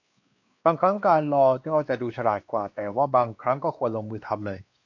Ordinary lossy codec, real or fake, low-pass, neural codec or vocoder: AAC, 48 kbps; fake; 7.2 kHz; codec, 16 kHz, 2 kbps, X-Codec, WavLM features, trained on Multilingual LibriSpeech